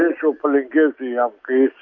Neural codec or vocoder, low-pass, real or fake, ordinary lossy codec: none; 7.2 kHz; real; MP3, 48 kbps